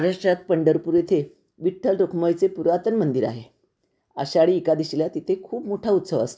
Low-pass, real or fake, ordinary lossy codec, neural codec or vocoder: none; real; none; none